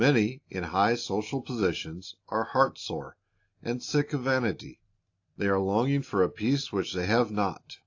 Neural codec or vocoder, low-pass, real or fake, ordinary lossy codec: none; 7.2 kHz; real; AAC, 48 kbps